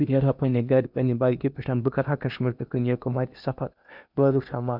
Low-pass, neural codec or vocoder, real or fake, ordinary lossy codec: 5.4 kHz; codec, 16 kHz in and 24 kHz out, 0.8 kbps, FocalCodec, streaming, 65536 codes; fake; none